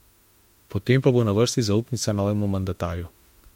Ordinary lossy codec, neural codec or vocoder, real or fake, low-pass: MP3, 64 kbps; autoencoder, 48 kHz, 32 numbers a frame, DAC-VAE, trained on Japanese speech; fake; 19.8 kHz